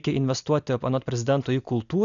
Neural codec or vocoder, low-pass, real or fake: none; 7.2 kHz; real